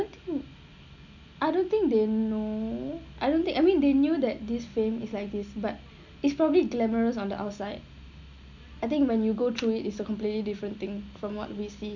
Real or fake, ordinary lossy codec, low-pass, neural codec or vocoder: real; none; 7.2 kHz; none